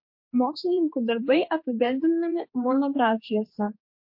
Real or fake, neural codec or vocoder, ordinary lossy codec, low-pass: fake; codec, 16 kHz, 2 kbps, X-Codec, HuBERT features, trained on general audio; MP3, 32 kbps; 5.4 kHz